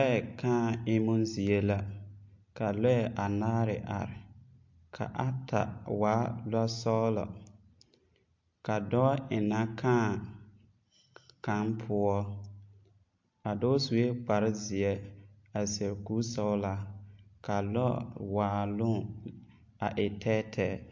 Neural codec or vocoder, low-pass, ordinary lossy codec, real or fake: none; 7.2 kHz; MP3, 48 kbps; real